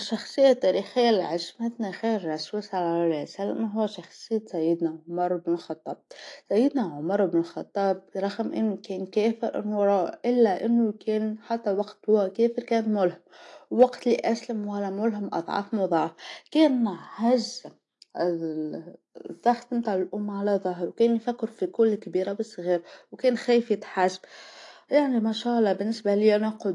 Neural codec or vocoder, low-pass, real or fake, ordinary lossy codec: none; 10.8 kHz; real; AAC, 48 kbps